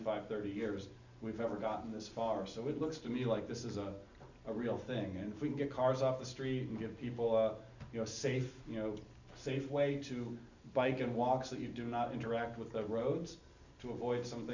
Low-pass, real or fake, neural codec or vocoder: 7.2 kHz; real; none